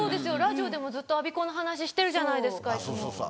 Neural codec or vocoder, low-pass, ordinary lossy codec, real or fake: none; none; none; real